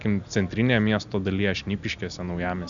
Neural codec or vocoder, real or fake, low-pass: none; real; 7.2 kHz